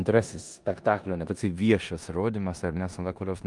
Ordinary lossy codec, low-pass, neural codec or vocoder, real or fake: Opus, 32 kbps; 10.8 kHz; codec, 16 kHz in and 24 kHz out, 0.9 kbps, LongCat-Audio-Codec, four codebook decoder; fake